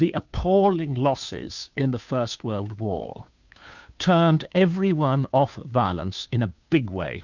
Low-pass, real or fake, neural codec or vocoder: 7.2 kHz; fake; codec, 16 kHz, 2 kbps, FunCodec, trained on Chinese and English, 25 frames a second